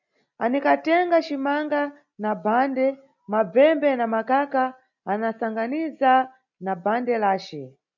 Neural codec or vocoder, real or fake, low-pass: none; real; 7.2 kHz